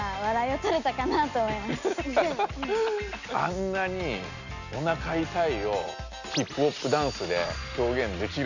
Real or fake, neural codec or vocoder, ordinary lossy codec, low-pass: real; none; none; 7.2 kHz